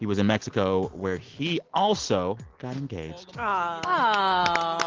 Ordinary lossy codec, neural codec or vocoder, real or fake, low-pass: Opus, 16 kbps; none; real; 7.2 kHz